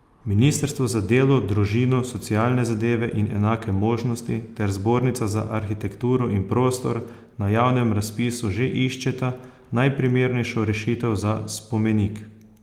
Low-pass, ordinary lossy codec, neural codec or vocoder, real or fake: 19.8 kHz; Opus, 32 kbps; none; real